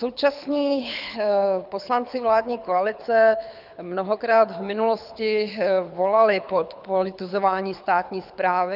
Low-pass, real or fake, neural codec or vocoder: 5.4 kHz; fake; codec, 24 kHz, 6 kbps, HILCodec